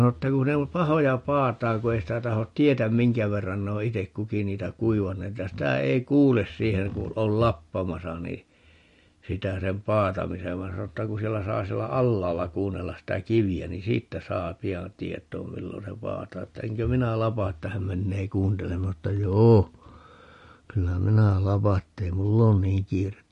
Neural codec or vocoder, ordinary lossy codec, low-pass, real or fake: none; MP3, 48 kbps; 14.4 kHz; real